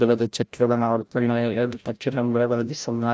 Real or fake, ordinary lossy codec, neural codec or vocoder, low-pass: fake; none; codec, 16 kHz, 0.5 kbps, FreqCodec, larger model; none